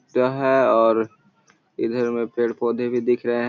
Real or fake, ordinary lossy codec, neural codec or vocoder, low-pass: real; none; none; 7.2 kHz